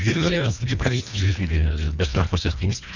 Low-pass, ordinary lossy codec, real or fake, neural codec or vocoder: 7.2 kHz; none; fake; codec, 24 kHz, 1.5 kbps, HILCodec